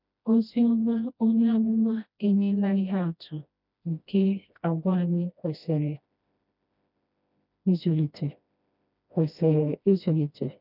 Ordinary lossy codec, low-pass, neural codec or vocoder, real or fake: none; 5.4 kHz; codec, 16 kHz, 1 kbps, FreqCodec, smaller model; fake